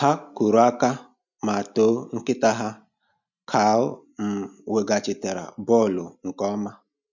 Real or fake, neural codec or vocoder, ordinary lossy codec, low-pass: real; none; none; 7.2 kHz